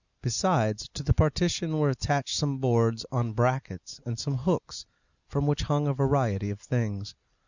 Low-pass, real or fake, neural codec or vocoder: 7.2 kHz; real; none